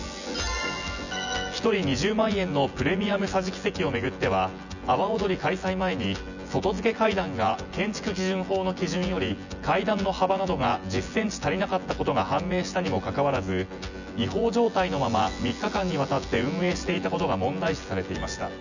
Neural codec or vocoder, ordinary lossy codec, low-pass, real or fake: vocoder, 24 kHz, 100 mel bands, Vocos; none; 7.2 kHz; fake